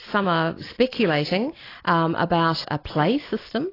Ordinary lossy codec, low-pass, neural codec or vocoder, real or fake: AAC, 24 kbps; 5.4 kHz; none; real